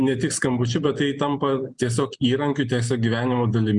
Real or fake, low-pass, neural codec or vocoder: real; 10.8 kHz; none